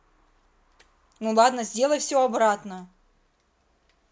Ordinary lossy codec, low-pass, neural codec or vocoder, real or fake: none; none; none; real